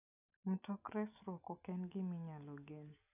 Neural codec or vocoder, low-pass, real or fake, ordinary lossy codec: none; 3.6 kHz; real; none